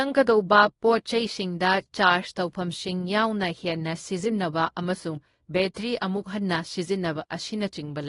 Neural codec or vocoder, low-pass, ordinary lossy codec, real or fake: codec, 24 kHz, 0.9 kbps, WavTokenizer, small release; 10.8 kHz; AAC, 32 kbps; fake